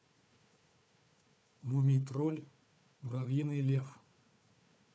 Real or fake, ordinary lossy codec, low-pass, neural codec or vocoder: fake; none; none; codec, 16 kHz, 4 kbps, FunCodec, trained on Chinese and English, 50 frames a second